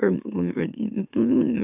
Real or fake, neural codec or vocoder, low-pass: fake; autoencoder, 44.1 kHz, a latent of 192 numbers a frame, MeloTTS; 3.6 kHz